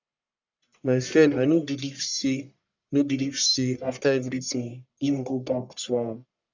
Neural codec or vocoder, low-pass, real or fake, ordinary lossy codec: codec, 44.1 kHz, 1.7 kbps, Pupu-Codec; 7.2 kHz; fake; none